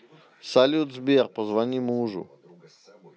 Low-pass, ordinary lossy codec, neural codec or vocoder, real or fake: none; none; none; real